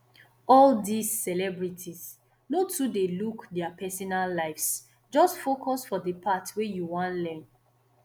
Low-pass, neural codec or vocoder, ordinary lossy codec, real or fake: none; none; none; real